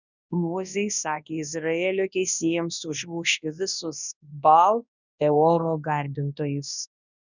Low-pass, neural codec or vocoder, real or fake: 7.2 kHz; codec, 24 kHz, 0.9 kbps, WavTokenizer, large speech release; fake